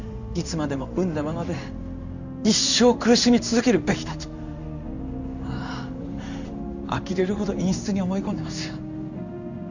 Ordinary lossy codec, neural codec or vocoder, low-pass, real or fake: none; codec, 16 kHz in and 24 kHz out, 1 kbps, XY-Tokenizer; 7.2 kHz; fake